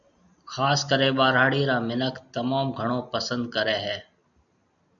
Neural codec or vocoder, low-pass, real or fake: none; 7.2 kHz; real